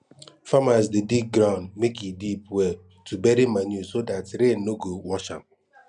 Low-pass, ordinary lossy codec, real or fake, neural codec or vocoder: 9.9 kHz; none; real; none